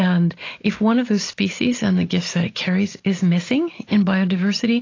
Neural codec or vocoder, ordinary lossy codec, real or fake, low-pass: none; AAC, 32 kbps; real; 7.2 kHz